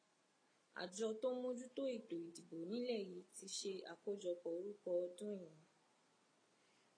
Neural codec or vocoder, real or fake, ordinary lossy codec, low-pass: none; real; AAC, 32 kbps; 9.9 kHz